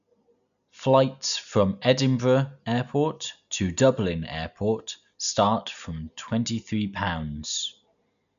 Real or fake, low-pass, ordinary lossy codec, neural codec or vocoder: real; 7.2 kHz; none; none